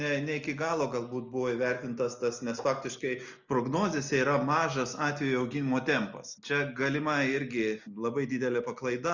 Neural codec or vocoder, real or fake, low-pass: none; real; 7.2 kHz